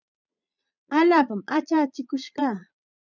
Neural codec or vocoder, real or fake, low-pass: vocoder, 22.05 kHz, 80 mel bands, Vocos; fake; 7.2 kHz